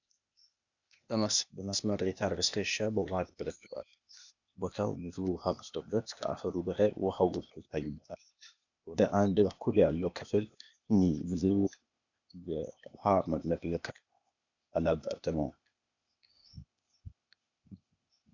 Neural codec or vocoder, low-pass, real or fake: codec, 16 kHz, 0.8 kbps, ZipCodec; 7.2 kHz; fake